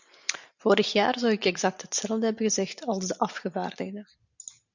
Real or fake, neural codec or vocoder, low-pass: real; none; 7.2 kHz